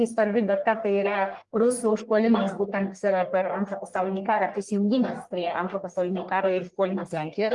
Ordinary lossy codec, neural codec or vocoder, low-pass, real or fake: Opus, 24 kbps; codec, 44.1 kHz, 1.7 kbps, Pupu-Codec; 10.8 kHz; fake